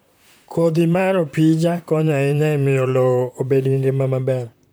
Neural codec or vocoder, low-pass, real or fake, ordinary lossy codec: vocoder, 44.1 kHz, 128 mel bands, Pupu-Vocoder; none; fake; none